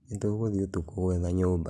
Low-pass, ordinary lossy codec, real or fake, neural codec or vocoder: none; none; real; none